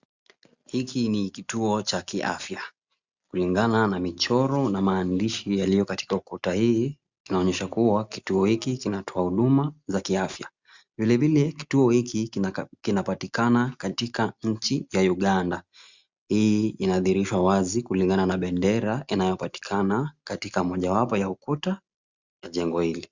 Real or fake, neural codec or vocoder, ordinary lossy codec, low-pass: real; none; Opus, 64 kbps; 7.2 kHz